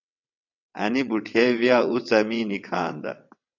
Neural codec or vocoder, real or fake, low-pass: vocoder, 22.05 kHz, 80 mel bands, WaveNeXt; fake; 7.2 kHz